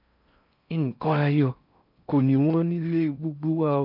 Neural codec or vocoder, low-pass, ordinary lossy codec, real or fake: codec, 16 kHz in and 24 kHz out, 0.6 kbps, FocalCodec, streaming, 4096 codes; 5.4 kHz; none; fake